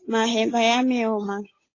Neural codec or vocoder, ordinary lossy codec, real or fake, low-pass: codec, 16 kHz, 8 kbps, FunCodec, trained on Chinese and English, 25 frames a second; AAC, 32 kbps; fake; 7.2 kHz